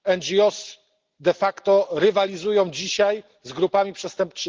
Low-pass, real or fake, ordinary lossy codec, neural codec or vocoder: 7.2 kHz; real; Opus, 16 kbps; none